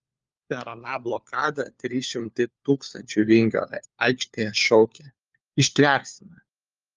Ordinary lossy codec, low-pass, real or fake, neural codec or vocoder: Opus, 32 kbps; 7.2 kHz; fake; codec, 16 kHz, 4 kbps, FunCodec, trained on LibriTTS, 50 frames a second